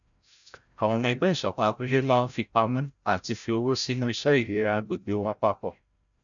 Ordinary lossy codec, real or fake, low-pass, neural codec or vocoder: none; fake; 7.2 kHz; codec, 16 kHz, 0.5 kbps, FreqCodec, larger model